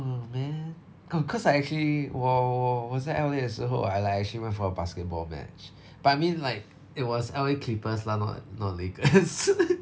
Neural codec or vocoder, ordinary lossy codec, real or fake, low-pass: none; none; real; none